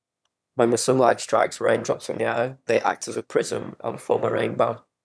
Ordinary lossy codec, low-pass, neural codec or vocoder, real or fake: none; none; autoencoder, 22.05 kHz, a latent of 192 numbers a frame, VITS, trained on one speaker; fake